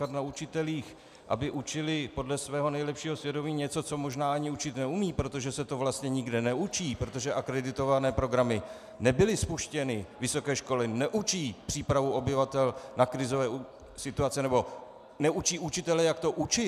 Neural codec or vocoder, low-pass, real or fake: none; 14.4 kHz; real